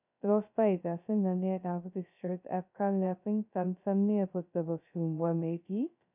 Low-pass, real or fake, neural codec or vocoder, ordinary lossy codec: 3.6 kHz; fake; codec, 16 kHz, 0.2 kbps, FocalCodec; none